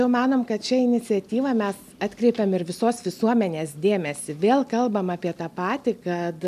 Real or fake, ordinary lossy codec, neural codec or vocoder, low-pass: real; MP3, 96 kbps; none; 14.4 kHz